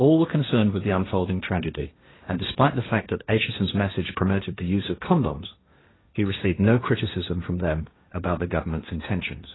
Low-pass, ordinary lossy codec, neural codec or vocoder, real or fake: 7.2 kHz; AAC, 16 kbps; codec, 16 kHz, 1.1 kbps, Voila-Tokenizer; fake